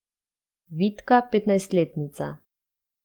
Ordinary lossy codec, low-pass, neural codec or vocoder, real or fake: Opus, 32 kbps; 19.8 kHz; codec, 44.1 kHz, 7.8 kbps, DAC; fake